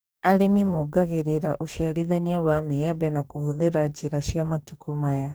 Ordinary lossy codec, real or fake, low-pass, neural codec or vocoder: none; fake; none; codec, 44.1 kHz, 2.6 kbps, DAC